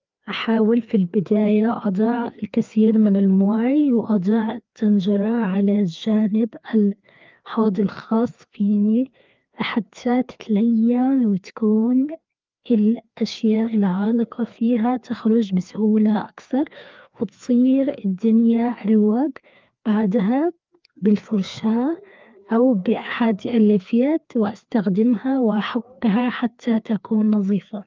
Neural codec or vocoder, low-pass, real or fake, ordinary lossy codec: codec, 16 kHz, 2 kbps, FreqCodec, larger model; 7.2 kHz; fake; Opus, 32 kbps